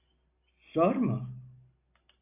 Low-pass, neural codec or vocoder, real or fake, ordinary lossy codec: 3.6 kHz; none; real; AAC, 32 kbps